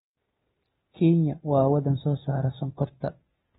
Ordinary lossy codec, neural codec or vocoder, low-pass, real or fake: AAC, 16 kbps; none; 19.8 kHz; real